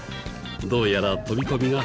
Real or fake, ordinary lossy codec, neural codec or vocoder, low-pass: real; none; none; none